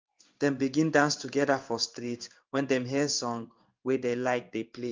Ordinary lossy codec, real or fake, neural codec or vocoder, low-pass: Opus, 24 kbps; fake; codec, 16 kHz in and 24 kHz out, 1 kbps, XY-Tokenizer; 7.2 kHz